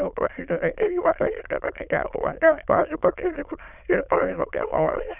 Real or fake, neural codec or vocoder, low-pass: fake; autoencoder, 22.05 kHz, a latent of 192 numbers a frame, VITS, trained on many speakers; 3.6 kHz